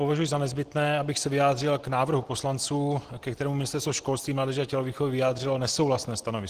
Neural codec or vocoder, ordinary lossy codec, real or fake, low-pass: none; Opus, 16 kbps; real; 14.4 kHz